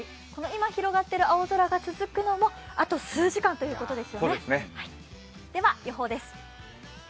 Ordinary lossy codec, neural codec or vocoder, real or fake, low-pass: none; none; real; none